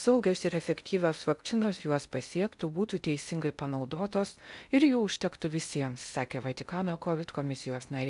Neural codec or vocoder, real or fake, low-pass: codec, 16 kHz in and 24 kHz out, 0.6 kbps, FocalCodec, streaming, 2048 codes; fake; 10.8 kHz